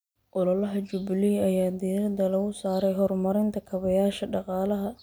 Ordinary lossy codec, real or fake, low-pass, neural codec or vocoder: none; real; none; none